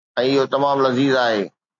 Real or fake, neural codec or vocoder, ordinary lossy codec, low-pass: real; none; AAC, 48 kbps; 7.2 kHz